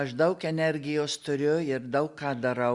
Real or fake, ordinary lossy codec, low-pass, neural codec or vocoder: real; Opus, 64 kbps; 10.8 kHz; none